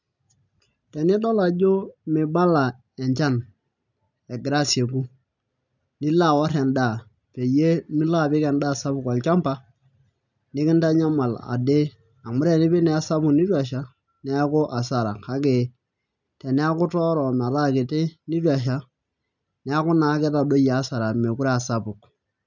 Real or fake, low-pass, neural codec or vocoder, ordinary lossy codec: real; 7.2 kHz; none; none